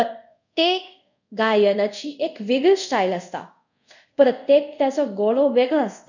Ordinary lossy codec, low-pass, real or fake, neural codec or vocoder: none; 7.2 kHz; fake; codec, 24 kHz, 0.5 kbps, DualCodec